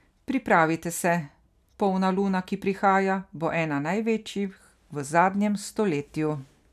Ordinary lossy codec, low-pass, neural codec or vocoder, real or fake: none; 14.4 kHz; none; real